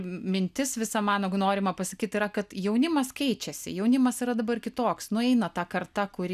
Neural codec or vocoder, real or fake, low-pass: none; real; 14.4 kHz